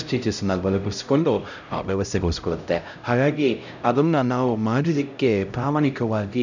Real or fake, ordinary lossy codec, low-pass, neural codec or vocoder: fake; none; 7.2 kHz; codec, 16 kHz, 0.5 kbps, X-Codec, HuBERT features, trained on LibriSpeech